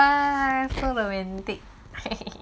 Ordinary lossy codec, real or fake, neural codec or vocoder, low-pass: none; real; none; none